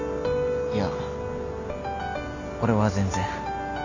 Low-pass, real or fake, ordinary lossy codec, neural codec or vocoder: 7.2 kHz; real; none; none